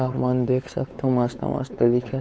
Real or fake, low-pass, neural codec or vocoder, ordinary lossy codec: fake; none; codec, 16 kHz, 4 kbps, X-Codec, WavLM features, trained on Multilingual LibriSpeech; none